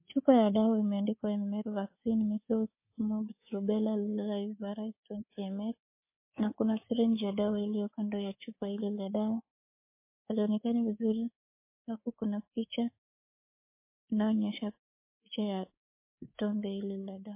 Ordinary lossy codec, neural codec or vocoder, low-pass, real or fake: MP3, 24 kbps; codec, 24 kHz, 3.1 kbps, DualCodec; 3.6 kHz; fake